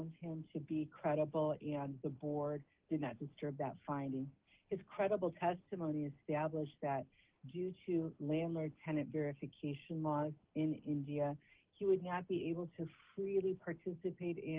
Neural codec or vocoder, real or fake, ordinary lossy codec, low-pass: none; real; Opus, 16 kbps; 3.6 kHz